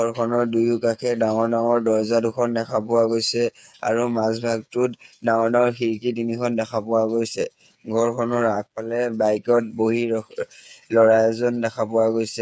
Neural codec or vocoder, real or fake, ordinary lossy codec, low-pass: codec, 16 kHz, 8 kbps, FreqCodec, smaller model; fake; none; none